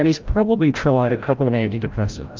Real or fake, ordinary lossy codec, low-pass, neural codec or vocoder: fake; Opus, 24 kbps; 7.2 kHz; codec, 16 kHz, 0.5 kbps, FreqCodec, larger model